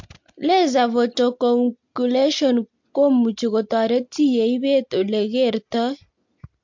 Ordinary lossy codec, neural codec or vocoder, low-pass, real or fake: MP3, 48 kbps; none; 7.2 kHz; real